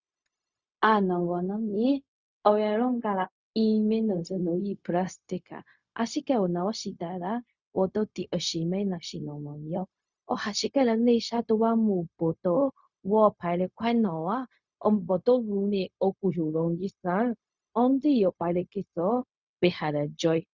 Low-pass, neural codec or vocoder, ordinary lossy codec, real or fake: 7.2 kHz; codec, 16 kHz, 0.4 kbps, LongCat-Audio-Codec; Opus, 64 kbps; fake